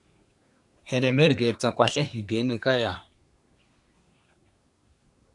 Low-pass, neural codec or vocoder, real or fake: 10.8 kHz; codec, 24 kHz, 1 kbps, SNAC; fake